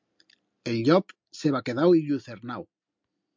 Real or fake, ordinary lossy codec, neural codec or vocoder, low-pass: real; MP3, 64 kbps; none; 7.2 kHz